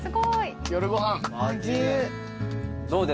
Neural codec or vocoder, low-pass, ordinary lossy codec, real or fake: none; none; none; real